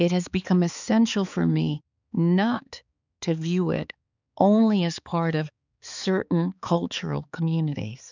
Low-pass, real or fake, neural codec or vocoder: 7.2 kHz; fake; codec, 16 kHz, 4 kbps, X-Codec, HuBERT features, trained on balanced general audio